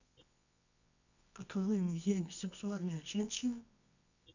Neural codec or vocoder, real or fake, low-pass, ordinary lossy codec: codec, 24 kHz, 0.9 kbps, WavTokenizer, medium music audio release; fake; 7.2 kHz; none